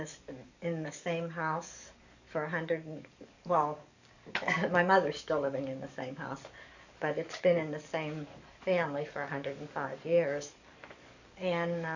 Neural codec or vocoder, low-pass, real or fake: codec, 44.1 kHz, 7.8 kbps, DAC; 7.2 kHz; fake